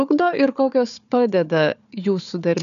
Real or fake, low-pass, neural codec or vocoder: fake; 7.2 kHz; codec, 16 kHz, 4 kbps, FunCodec, trained on Chinese and English, 50 frames a second